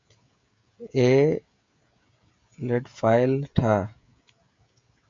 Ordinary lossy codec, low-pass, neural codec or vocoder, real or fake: MP3, 48 kbps; 7.2 kHz; codec, 16 kHz, 16 kbps, FreqCodec, smaller model; fake